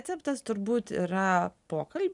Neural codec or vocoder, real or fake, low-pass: none; real; 10.8 kHz